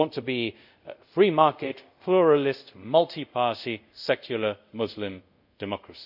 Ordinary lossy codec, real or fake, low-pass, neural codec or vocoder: none; fake; 5.4 kHz; codec, 24 kHz, 0.5 kbps, DualCodec